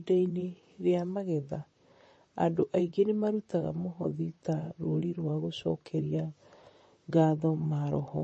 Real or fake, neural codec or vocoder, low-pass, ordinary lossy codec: fake; vocoder, 44.1 kHz, 128 mel bands, Pupu-Vocoder; 10.8 kHz; MP3, 32 kbps